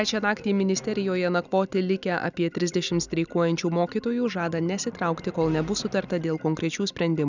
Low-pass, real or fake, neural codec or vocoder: 7.2 kHz; real; none